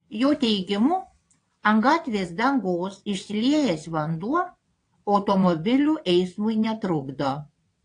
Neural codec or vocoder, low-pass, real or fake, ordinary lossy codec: vocoder, 22.05 kHz, 80 mel bands, WaveNeXt; 9.9 kHz; fake; AAC, 48 kbps